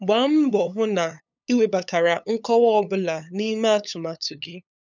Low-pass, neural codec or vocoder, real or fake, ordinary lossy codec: 7.2 kHz; codec, 16 kHz, 8 kbps, FunCodec, trained on LibriTTS, 25 frames a second; fake; none